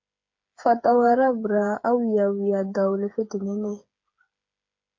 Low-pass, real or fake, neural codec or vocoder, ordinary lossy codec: 7.2 kHz; fake; codec, 16 kHz, 8 kbps, FreqCodec, smaller model; MP3, 48 kbps